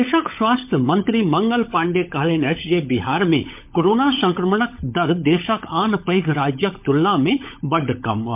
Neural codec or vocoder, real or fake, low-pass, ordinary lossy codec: codec, 16 kHz, 16 kbps, FunCodec, trained on LibriTTS, 50 frames a second; fake; 3.6 kHz; MP3, 32 kbps